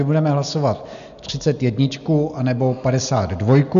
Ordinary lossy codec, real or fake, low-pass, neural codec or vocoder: MP3, 96 kbps; real; 7.2 kHz; none